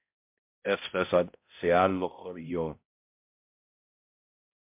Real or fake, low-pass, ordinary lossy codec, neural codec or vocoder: fake; 3.6 kHz; MP3, 32 kbps; codec, 16 kHz, 0.5 kbps, X-Codec, HuBERT features, trained on balanced general audio